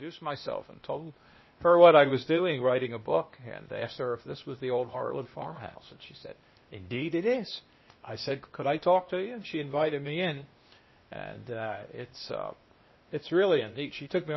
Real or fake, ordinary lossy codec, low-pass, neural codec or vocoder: fake; MP3, 24 kbps; 7.2 kHz; codec, 16 kHz, 0.8 kbps, ZipCodec